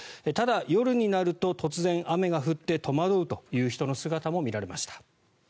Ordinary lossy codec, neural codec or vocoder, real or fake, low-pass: none; none; real; none